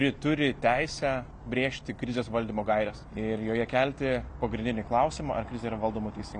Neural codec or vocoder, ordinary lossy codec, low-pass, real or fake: none; MP3, 96 kbps; 9.9 kHz; real